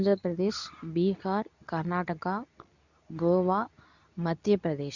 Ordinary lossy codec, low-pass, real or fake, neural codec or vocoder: none; 7.2 kHz; fake; codec, 24 kHz, 0.9 kbps, WavTokenizer, medium speech release version 2